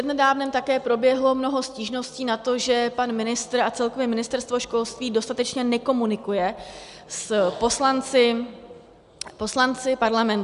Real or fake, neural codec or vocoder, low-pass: real; none; 10.8 kHz